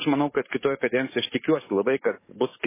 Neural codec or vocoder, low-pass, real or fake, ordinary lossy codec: codec, 44.1 kHz, 7.8 kbps, DAC; 3.6 kHz; fake; MP3, 16 kbps